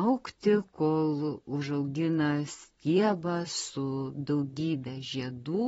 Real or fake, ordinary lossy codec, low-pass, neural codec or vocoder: fake; AAC, 24 kbps; 19.8 kHz; vocoder, 44.1 kHz, 128 mel bands, Pupu-Vocoder